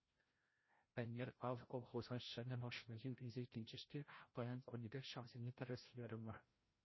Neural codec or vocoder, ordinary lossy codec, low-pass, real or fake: codec, 16 kHz, 0.5 kbps, FreqCodec, larger model; MP3, 24 kbps; 7.2 kHz; fake